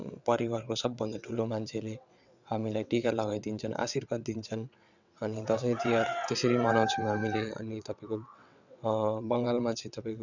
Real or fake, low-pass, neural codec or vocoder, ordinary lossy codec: fake; 7.2 kHz; vocoder, 22.05 kHz, 80 mel bands, WaveNeXt; none